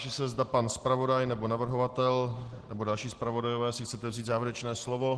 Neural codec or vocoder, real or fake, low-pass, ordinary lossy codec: none; real; 10.8 kHz; Opus, 16 kbps